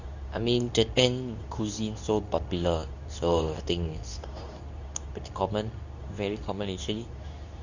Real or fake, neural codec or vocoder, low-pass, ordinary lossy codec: fake; codec, 24 kHz, 0.9 kbps, WavTokenizer, medium speech release version 2; 7.2 kHz; none